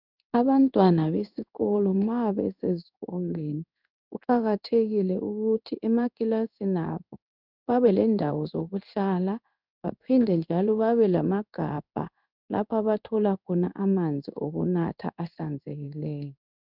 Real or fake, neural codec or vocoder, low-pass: fake; codec, 16 kHz in and 24 kHz out, 1 kbps, XY-Tokenizer; 5.4 kHz